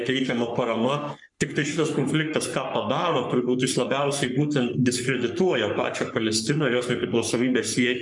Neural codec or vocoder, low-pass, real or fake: codec, 44.1 kHz, 3.4 kbps, Pupu-Codec; 10.8 kHz; fake